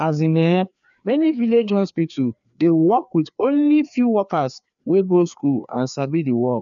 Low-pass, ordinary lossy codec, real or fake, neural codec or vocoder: 7.2 kHz; none; fake; codec, 16 kHz, 2 kbps, FreqCodec, larger model